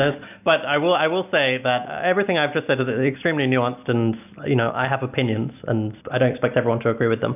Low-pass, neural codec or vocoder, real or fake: 3.6 kHz; none; real